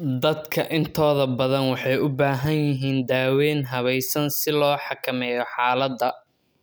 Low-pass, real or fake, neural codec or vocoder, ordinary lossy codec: none; real; none; none